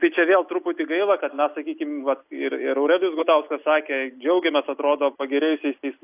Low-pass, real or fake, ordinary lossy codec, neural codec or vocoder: 3.6 kHz; real; AAC, 32 kbps; none